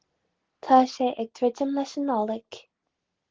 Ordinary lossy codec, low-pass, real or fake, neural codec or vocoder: Opus, 16 kbps; 7.2 kHz; real; none